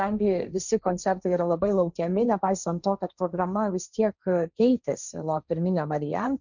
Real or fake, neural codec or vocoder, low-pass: fake; codec, 16 kHz, 1.1 kbps, Voila-Tokenizer; 7.2 kHz